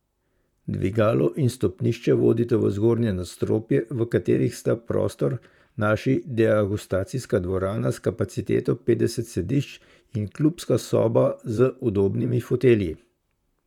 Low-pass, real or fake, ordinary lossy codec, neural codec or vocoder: 19.8 kHz; fake; none; vocoder, 44.1 kHz, 128 mel bands, Pupu-Vocoder